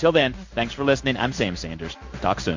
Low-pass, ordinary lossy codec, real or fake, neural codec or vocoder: 7.2 kHz; MP3, 48 kbps; fake; codec, 16 kHz in and 24 kHz out, 1 kbps, XY-Tokenizer